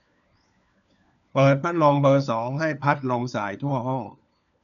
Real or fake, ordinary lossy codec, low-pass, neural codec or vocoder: fake; none; 7.2 kHz; codec, 16 kHz, 4 kbps, FunCodec, trained on LibriTTS, 50 frames a second